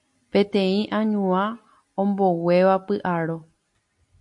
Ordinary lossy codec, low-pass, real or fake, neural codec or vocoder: MP3, 64 kbps; 10.8 kHz; real; none